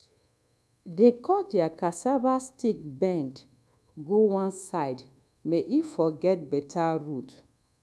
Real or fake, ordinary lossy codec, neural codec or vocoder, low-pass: fake; none; codec, 24 kHz, 1.2 kbps, DualCodec; none